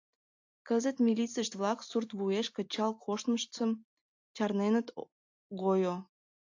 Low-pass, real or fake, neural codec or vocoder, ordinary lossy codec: 7.2 kHz; real; none; AAC, 48 kbps